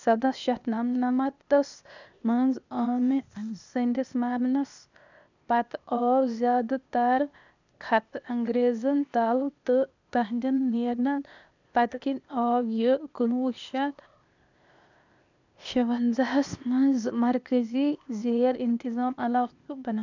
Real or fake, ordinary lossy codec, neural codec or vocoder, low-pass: fake; none; codec, 16 kHz, 0.8 kbps, ZipCodec; 7.2 kHz